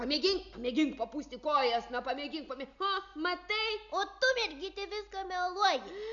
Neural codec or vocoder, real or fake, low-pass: none; real; 7.2 kHz